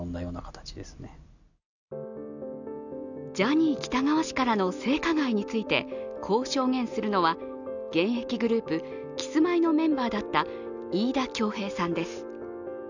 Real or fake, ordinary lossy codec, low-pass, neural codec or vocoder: real; none; 7.2 kHz; none